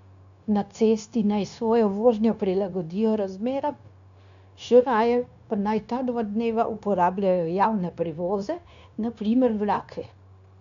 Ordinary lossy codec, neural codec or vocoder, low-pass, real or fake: none; codec, 16 kHz, 0.9 kbps, LongCat-Audio-Codec; 7.2 kHz; fake